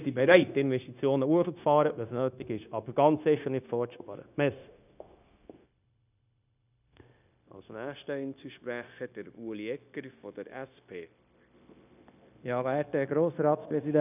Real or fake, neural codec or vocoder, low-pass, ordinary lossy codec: fake; codec, 16 kHz, 0.9 kbps, LongCat-Audio-Codec; 3.6 kHz; none